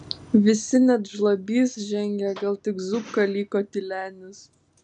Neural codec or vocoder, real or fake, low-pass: none; real; 9.9 kHz